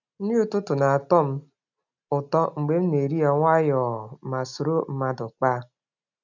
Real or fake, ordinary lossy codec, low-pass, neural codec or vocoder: real; none; 7.2 kHz; none